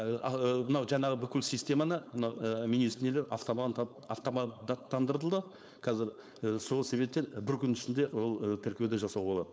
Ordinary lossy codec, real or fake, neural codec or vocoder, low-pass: none; fake; codec, 16 kHz, 4.8 kbps, FACodec; none